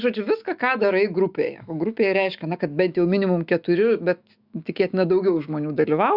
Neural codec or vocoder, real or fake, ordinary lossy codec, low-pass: autoencoder, 48 kHz, 128 numbers a frame, DAC-VAE, trained on Japanese speech; fake; Opus, 64 kbps; 5.4 kHz